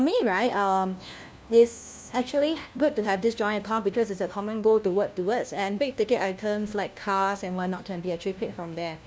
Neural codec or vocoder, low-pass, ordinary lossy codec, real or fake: codec, 16 kHz, 1 kbps, FunCodec, trained on LibriTTS, 50 frames a second; none; none; fake